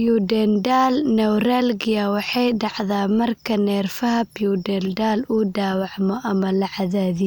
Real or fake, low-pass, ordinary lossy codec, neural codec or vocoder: real; none; none; none